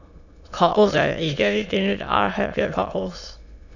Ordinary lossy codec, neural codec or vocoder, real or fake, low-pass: none; autoencoder, 22.05 kHz, a latent of 192 numbers a frame, VITS, trained on many speakers; fake; 7.2 kHz